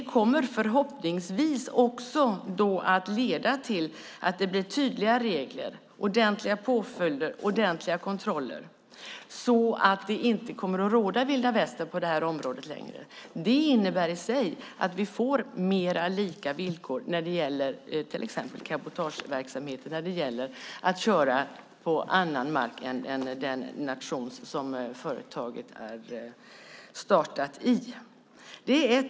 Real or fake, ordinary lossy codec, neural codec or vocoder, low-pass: real; none; none; none